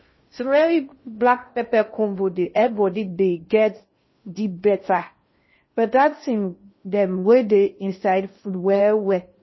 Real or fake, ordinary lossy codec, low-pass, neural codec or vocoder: fake; MP3, 24 kbps; 7.2 kHz; codec, 16 kHz in and 24 kHz out, 0.6 kbps, FocalCodec, streaming, 2048 codes